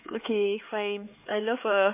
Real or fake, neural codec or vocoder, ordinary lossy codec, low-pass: fake; codec, 16 kHz, 4 kbps, X-Codec, HuBERT features, trained on LibriSpeech; MP3, 24 kbps; 3.6 kHz